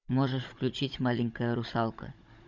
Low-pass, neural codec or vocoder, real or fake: 7.2 kHz; codec, 16 kHz, 16 kbps, FunCodec, trained on Chinese and English, 50 frames a second; fake